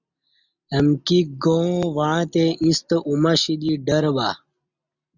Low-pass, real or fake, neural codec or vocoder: 7.2 kHz; real; none